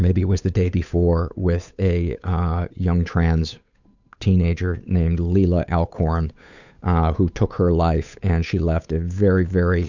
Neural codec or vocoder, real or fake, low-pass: codec, 16 kHz, 8 kbps, FunCodec, trained on Chinese and English, 25 frames a second; fake; 7.2 kHz